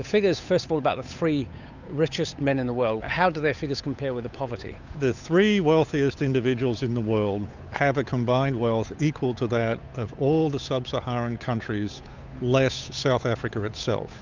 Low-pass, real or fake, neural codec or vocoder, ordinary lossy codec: 7.2 kHz; fake; codec, 16 kHz, 8 kbps, FunCodec, trained on Chinese and English, 25 frames a second; Opus, 64 kbps